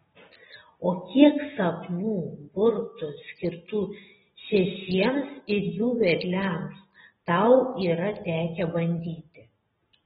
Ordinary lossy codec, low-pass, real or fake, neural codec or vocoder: AAC, 16 kbps; 19.8 kHz; real; none